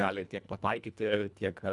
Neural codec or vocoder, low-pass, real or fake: codec, 24 kHz, 1.5 kbps, HILCodec; 10.8 kHz; fake